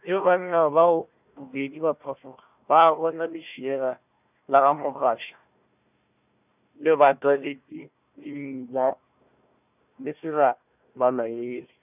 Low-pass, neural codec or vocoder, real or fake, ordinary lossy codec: 3.6 kHz; codec, 16 kHz, 1 kbps, FunCodec, trained on Chinese and English, 50 frames a second; fake; none